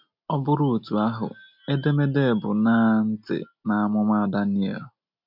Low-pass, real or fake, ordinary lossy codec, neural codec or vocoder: 5.4 kHz; real; none; none